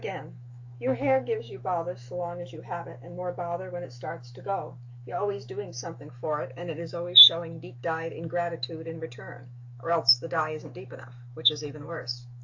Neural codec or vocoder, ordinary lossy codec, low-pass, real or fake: autoencoder, 48 kHz, 128 numbers a frame, DAC-VAE, trained on Japanese speech; AAC, 48 kbps; 7.2 kHz; fake